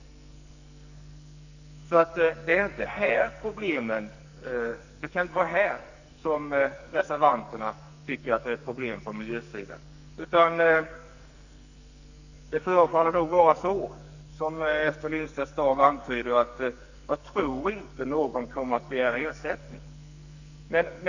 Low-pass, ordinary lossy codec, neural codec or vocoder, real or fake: 7.2 kHz; none; codec, 44.1 kHz, 2.6 kbps, SNAC; fake